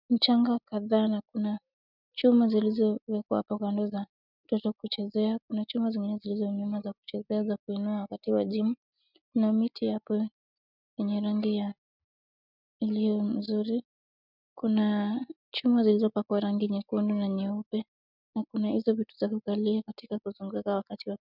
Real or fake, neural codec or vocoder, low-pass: real; none; 5.4 kHz